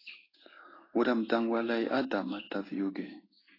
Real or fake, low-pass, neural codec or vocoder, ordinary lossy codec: fake; 5.4 kHz; codec, 16 kHz in and 24 kHz out, 1 kbps, XY-Tokenizer; AAC, 24 kbps